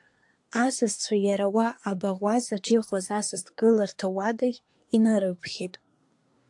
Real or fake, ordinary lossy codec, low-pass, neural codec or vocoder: fake; AAC, 64 kbps; 10.8 kHz; codec, 24 kHz, 1 kbps, SNAC